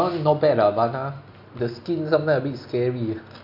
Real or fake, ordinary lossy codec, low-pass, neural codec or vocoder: real; none; 5.4 kHz; none